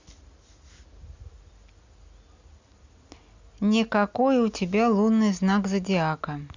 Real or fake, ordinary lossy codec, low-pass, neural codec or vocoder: real; Opus, 64 kbps; 7.2 kHz; none